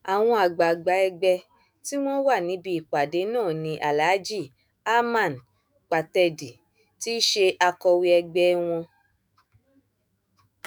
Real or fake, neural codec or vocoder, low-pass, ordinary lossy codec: fake; autoencoder, 48 kHz, 128 numbers a frame, DAC-VAE, trained on Japanese speech; none; none